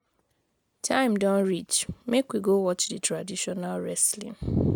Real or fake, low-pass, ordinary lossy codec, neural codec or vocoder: real; none; none; none